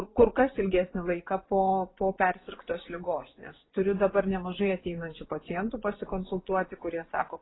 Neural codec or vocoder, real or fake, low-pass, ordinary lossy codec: vocoder, 24 kHz, 100 mel bands, Vocos; fake; 7.2 kHz; AAC, 16 kbps